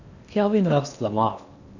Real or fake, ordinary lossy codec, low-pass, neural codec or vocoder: fake; none; 7.2 kHz; codec, 16 kHz in and 24 kHz out, 0.8 kbps, FocalCodec, streaming, 65536 codes